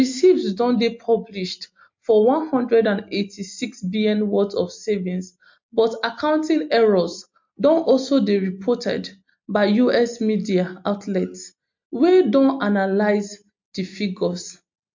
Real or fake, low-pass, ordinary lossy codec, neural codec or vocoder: real; 7.2 kHz; MP3, 48 kbps; none